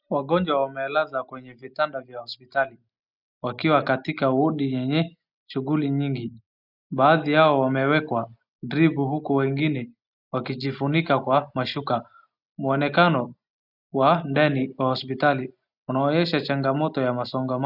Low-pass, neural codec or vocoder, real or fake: 5.4 kHz; none; real